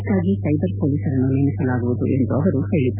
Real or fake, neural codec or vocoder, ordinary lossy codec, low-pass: real; none; none; 3.6 kHz